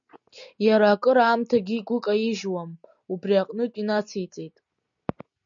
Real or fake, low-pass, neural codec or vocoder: real; 7.2 kHz; none